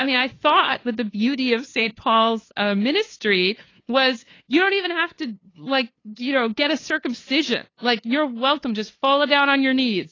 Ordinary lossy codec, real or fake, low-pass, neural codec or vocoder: AAC, 32 kbps; fake; 7.2 kHz; codec, 16 kHz, 2 kbps, FunCodec, trained on LibriTTS, 25 frames a second